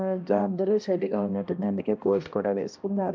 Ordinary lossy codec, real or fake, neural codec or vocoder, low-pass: none; fake; codec, 16 kHz, 1 kbps, X-Codec, HuBERT features, trained on balanced general audio; none